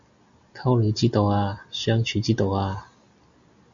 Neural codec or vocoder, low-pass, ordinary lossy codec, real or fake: none; 7.2 kHz; AAC, 64 kbps; real